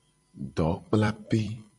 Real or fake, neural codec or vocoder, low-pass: fake; vocoder, 44.1 kHz, 128 mel bands every 256 samples, BigVGAN v2; 10.8 kHz